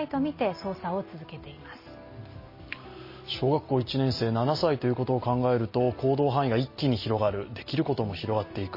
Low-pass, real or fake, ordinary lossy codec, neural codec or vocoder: 5.4 kHz; real; MP3, 24 kbps; none